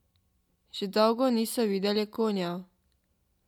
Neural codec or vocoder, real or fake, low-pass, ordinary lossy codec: none; real; 19.8 kHz; none